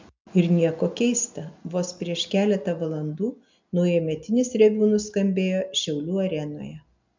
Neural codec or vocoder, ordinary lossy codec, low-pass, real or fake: none; MP3, 64 kbps; 7.2 kHz; real